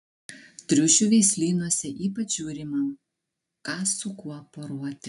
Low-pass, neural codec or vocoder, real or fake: 10.8 kHz; none; real